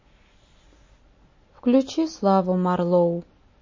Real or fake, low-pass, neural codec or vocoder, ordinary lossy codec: real; 7.2 kHz; none; MP3, 32 kbps